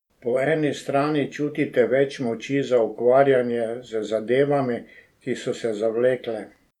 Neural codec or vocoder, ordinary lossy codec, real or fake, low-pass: vocoder, 44.1 kHz, 128 mel bands every 512 samples, BigVGAN v2; none; fake; 19.8 kHz